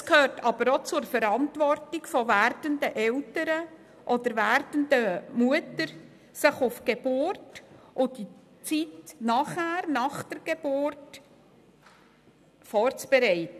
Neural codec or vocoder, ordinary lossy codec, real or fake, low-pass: none; none; real; 14.4 kHz